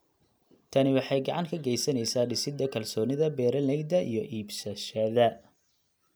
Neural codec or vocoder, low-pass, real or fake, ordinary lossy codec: none; none; real; none